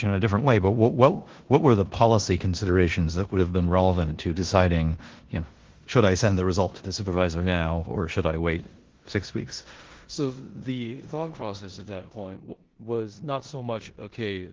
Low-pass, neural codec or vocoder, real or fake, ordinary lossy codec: 7.2 kHz; codec, 16 kHz in and 24 kHz out, 0.9 kbps, LongCat-Audio-Codec, four codebook decoder; fake; Opus, 16 kbps